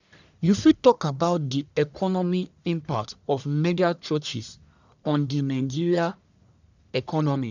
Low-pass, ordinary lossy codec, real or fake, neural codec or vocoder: 7.2 kHz; none; fake; codec, 44.1 kHz, 1.7 kbps, Pupu-Codec